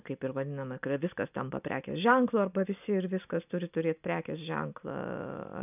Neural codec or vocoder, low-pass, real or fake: none; 3.6 kHz; real